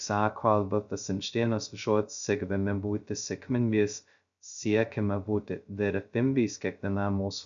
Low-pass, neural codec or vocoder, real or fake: 7.2 kHz; codec, 16 kHz, 0.2 kbps, FocalCodec; fake